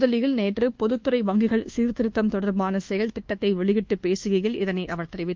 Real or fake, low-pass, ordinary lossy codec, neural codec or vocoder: fake; 7.2 kHz; Opus, 32 kbps; codec, 24 kHz, 1.2 kbps, DualCodec